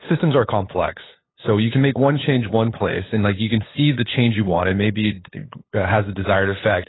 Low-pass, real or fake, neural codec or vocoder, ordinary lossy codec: 7.2 kHz; fake; codec, 16 kHz, 8 kbps, FunCodec, trained on LibriTTS, 25 frames a second; AAC, 16 kbps